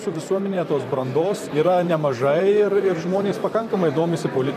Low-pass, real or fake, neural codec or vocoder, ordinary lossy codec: 14.4 kHz; fake; vocoder, 44.1 kHz, 128 mel bands, Pupu-Vocoder; MP3, 96 kbps